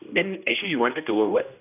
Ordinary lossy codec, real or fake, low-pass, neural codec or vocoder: none; fake; 3.6 kHz; codec, 16 kHz, 1 kbps, X-Codec, HuBERT features, trained on general audio